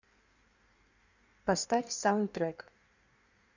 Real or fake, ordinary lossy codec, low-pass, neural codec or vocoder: fake; none; 7.2 kHz; codec, 16 kHz in and 24 kHz out, 1.1 kbps, FireRedTTS-2 codec